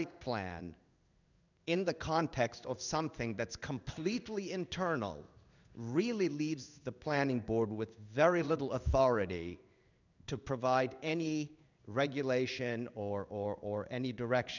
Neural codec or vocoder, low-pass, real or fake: codec, 16 kHz in and 24 kHz out, 1 kbps, XY-Tokenizer; 7.2 kHz; fake